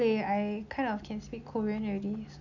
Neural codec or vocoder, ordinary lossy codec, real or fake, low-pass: none; none; real; 7.2 kHz